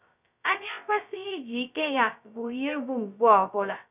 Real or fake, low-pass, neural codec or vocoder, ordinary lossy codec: fake; 3.6 kHz; codec, 16 kHz, 0.2 kbps, FocalCodec; none